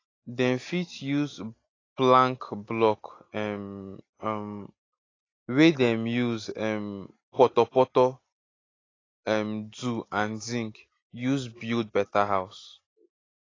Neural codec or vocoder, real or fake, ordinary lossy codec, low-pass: none; real; AAC, 32 kbps; 7.2 kHz